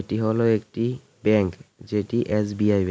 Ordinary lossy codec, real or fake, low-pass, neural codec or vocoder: none; real; none; none